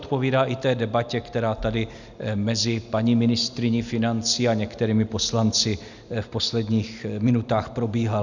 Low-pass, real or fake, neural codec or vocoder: 7.2 kHz; real; none